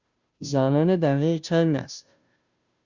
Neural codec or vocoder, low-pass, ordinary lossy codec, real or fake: codec, 16 kHz, 0.5 kbps, FunCodec, trained on Chinese and English, 25 frames a second; 7.2 kHz; Opus, 64 kbps; fake